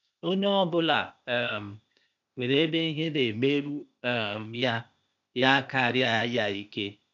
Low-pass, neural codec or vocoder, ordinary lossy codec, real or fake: 7.2 kHz; codec, 16 kHz, 0.8 kbps, ZipCodec; none; fake